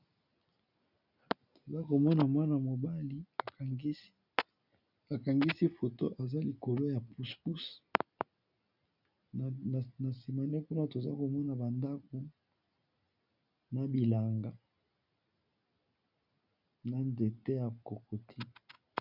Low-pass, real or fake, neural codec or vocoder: 5.4 kHz; real; none